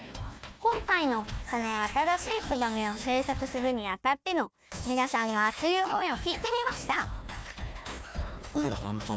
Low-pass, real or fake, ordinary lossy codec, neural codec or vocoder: none; fake; none; codec, 16 kHz, 1 kbps, FunCodec, trained on Chinese and English, 50 frames a second